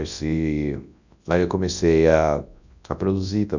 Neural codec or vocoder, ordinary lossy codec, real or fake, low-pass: codec, 24 kHz, 0.9 kbps, WavTokenizer, large speech release; none; fake; 7.2 kHz